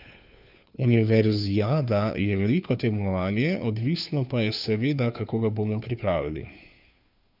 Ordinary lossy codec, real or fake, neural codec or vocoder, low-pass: none; fake; codec, 16 kHz, 2 kbps, FunCodec, trained on Chinese and English, 25 frames a second; 5.4 kHz